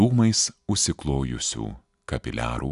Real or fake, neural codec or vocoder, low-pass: real; none; 10.8 kHz